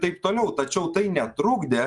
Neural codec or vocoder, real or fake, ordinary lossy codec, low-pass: none; real; Opus, 32 kbps; 10.8 kHz